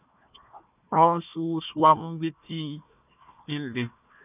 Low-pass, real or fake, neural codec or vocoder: 3.6 kHz; fake; codec, 16 kHz, 1 kbps, FunCodec, trained on Chinese and English, 50 frames a second